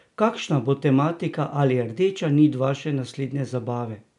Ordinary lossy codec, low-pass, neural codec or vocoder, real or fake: none; 10.8 kHz; none; real